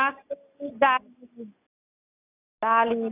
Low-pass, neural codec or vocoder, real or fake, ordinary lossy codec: 3.6 kHz; none; real; none